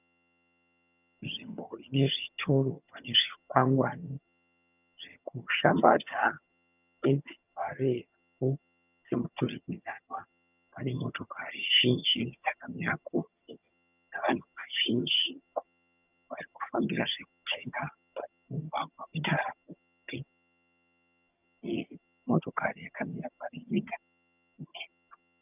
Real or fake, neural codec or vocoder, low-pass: fake; vocoder, 22.05 kHz, 80 mel bands, HiFi-GAN; 3.6 kHz